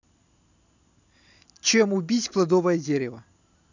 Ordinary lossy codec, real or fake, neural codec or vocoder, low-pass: none; real; none; 7.2 kHz